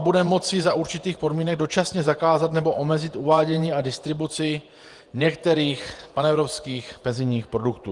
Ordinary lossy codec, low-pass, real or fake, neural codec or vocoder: Opus, 24 kbps; 10.8 kHz; fake; vocoder, 48 kHz, 128 mel bands, Vocos